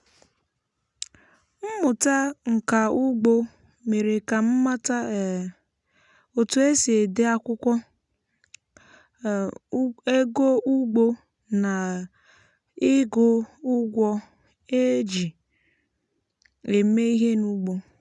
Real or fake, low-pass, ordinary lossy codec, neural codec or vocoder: real; 10.8 kHz; none; none